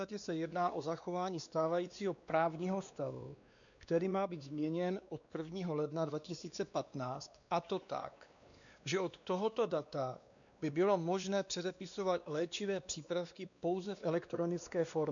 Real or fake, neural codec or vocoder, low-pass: fake; codec, 16 kHz, 2 kbps, X-Codec, WavLM features, trained on Multilingual LibriSpeech; 7.2 kHz